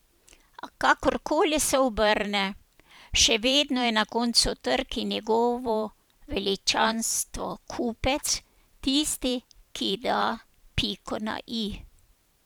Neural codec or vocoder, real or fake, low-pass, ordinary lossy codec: vocoder, 44.1 kHz, 128 mel bands every 512 samples, BigVGAN v2; fake; none; none